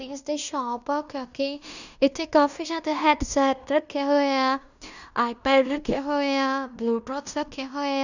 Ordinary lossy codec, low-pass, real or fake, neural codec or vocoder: none; 7.2 kHz; fake; codec, 16 kHz in and 24 kHz out, 0.9 kbps, LongCat-Audio-Codec, fine tuned four codebook decoder